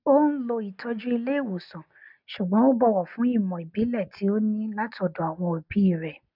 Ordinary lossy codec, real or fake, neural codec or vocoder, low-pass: none; real; none; 5.4 kHz